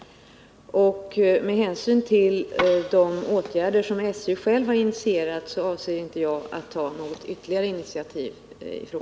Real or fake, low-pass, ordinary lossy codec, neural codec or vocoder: real; none; none; none